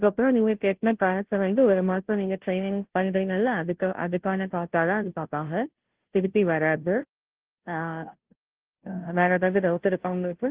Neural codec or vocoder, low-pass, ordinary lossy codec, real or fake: codec, 16 kHz, 0.5 kbps, FunCodec, trained on Chinese and English, 25 frames a second; 3.6 kHz; Opus, 16 kbps; fake